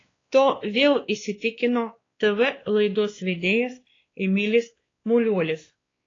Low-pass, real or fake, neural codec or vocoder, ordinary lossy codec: 7.2 kHz; fake; codec, 16 kHz, 2 kbps, X-Codec, WavLM features, trained on Multilingual LibriSpeech; AAC, 32 kbps